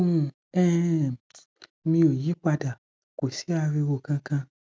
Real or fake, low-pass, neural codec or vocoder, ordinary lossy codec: real; none; none; none